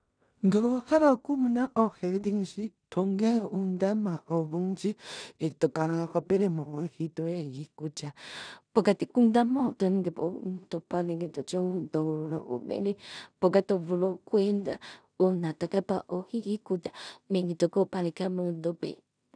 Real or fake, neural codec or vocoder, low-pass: fake; codec, 16 kHz in and 24 kHz out, 0.4 kbps, LongCat-Audio-Codec, two codebook decoder; 9.9 kHz